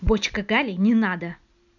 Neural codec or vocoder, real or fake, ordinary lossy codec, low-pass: none; real; none; 7.2 kHz